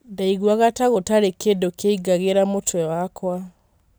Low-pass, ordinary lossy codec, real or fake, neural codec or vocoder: none; none; real; none